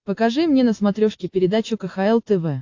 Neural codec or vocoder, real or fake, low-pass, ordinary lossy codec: none; real; 7.2 kHz; AAC, 48 kbps